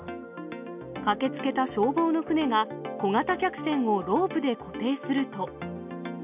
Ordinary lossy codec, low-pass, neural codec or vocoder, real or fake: none; 3.6 kHz; none; real